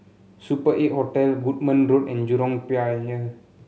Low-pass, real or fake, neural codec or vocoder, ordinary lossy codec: none; real; none; none